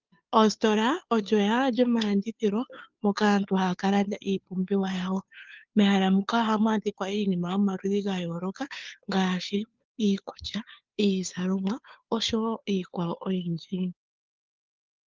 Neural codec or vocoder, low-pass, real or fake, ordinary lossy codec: codec, 16 kHz, 2 kbps, FunCodec, trained on Chinese and English, 25 frames a second; 7.2 kHz; fake; Opus, 24 kbps